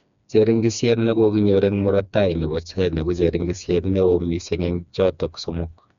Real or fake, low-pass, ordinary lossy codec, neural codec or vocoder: fake; 7.2 kHz; none; codec, 16 kHz, 2 kbps, FreqCodec, smaller model